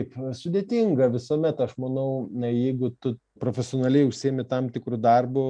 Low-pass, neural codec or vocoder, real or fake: 9.9 kHz; none; real